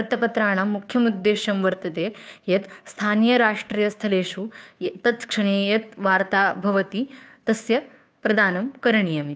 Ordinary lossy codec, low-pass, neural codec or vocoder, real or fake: none; none; codec, 16 kHz, 2 kbps, FunCodec, trained on Chinese and English, 25 frames a second; fake